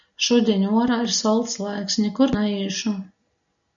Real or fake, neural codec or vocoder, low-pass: real; none; 7.2 kHz